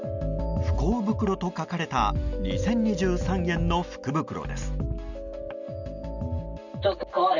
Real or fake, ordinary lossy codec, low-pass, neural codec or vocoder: real; none; 7.2 kHz; none